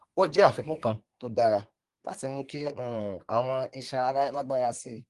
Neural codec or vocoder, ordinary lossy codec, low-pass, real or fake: codec, 24 kHz, 1 kbps, SNAC; Opus, 24 kbps; 10.8 kHz; fake